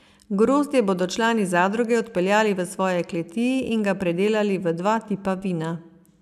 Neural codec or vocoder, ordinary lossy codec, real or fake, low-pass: none; none; real; 14.4 kHz